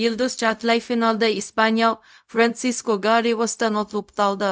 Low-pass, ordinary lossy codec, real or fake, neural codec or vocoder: none; none; fake; codec, 16 kHz, 0.4 kbps, LongCat-Audio-Codec